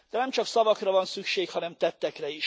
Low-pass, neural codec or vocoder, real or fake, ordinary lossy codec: none; none; real; none